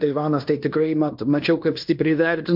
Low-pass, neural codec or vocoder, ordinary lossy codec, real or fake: 5.4 kHz; codec, 16 kHz in and 24 kHz out, 0.9 kbps, LongCat-Audio-Codec, fine tuned four codebook decoder; MP3, 48 kbps; fake